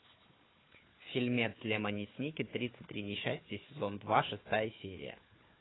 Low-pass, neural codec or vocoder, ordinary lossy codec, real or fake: 7.2 kHz; codec, 16 kHz, 4 kbps, FunCodec, trained on Chinese and English, 50 frames a second; AAC, 16 kbps; fake